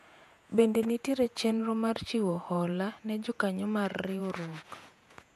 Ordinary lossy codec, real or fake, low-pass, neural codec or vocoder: MP3, 96 kbps; fake; 14.4 kHz; vocoder, 48 kHz, 128 mel bands, Vocos